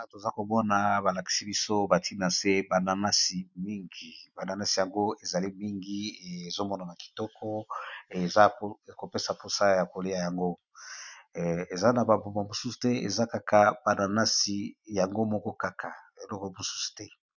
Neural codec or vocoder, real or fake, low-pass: none; real; 7.2 kHz